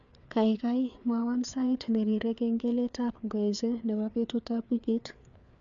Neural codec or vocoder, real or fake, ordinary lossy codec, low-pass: codec, 16 kHz, 4 kbps, FreqCodec, larger model; fake; none; 7.2 kHz